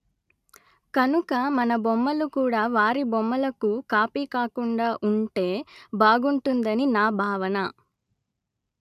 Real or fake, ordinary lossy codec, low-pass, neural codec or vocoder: real; none; 14.4 kHz; none